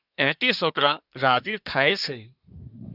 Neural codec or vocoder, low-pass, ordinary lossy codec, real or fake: codec, 24 kHz, 1 kbps, SNAC; 5.4 kHz; Opus, 64 kbps; fake